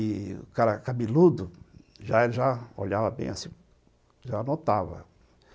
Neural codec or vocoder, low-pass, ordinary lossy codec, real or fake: none; none; none; real